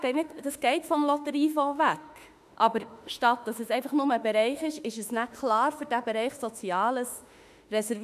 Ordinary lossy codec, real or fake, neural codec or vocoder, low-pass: none; fake; autoencoder, 48 kHz, 32 numbers a frame, DAC-VAE, trained on Japanese speech; 14.4 kHz